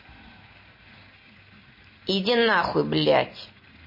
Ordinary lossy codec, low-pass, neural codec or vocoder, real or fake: MP3, 24 kbps; 5.4 kHz; none; real